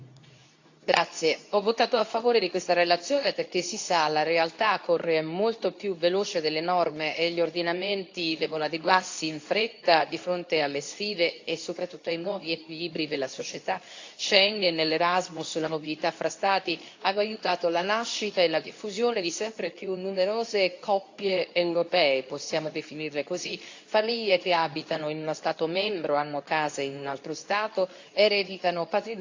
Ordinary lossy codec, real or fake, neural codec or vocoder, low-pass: AAC, 48 kbps; fake; codec, 24 kHz, 0.9 kbps, WavTokenizer, medium speech release version 2; 7.2 kHz